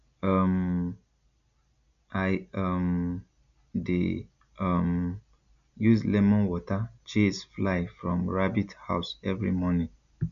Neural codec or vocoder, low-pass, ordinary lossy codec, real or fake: none; 7.2 kHz; none; real